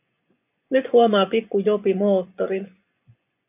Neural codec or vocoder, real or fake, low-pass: vocoder, 24 kHz, 100 mel bands, Vocos; fake; 3.6 kHz